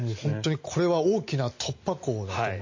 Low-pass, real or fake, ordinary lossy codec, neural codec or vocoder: 7.2 kHz; real; MP3, 32 kbps; none